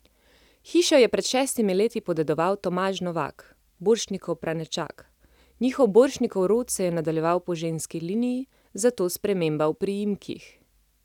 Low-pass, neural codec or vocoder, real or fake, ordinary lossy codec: 19.8 kHz; none; real; none